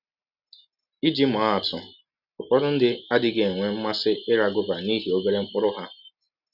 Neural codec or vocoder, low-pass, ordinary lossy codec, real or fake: none; 5.4 kHz; none; real